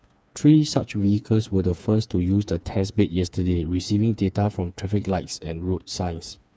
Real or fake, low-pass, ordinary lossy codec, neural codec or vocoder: fake; none; none; codec, 16 kHz, 4 kbps, FreqCodec, smaller model